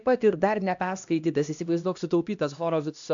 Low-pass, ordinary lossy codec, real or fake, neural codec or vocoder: 7.2 kHz; AAC, 64 kbps; fake; codec, 16 kHz, 1 kbps, X-Codec, HuBERT features, trained on LibriSpeech